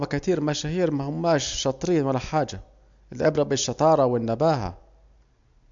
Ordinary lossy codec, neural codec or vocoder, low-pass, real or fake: MP3, 64 kbps; none; 7.2 kHz; real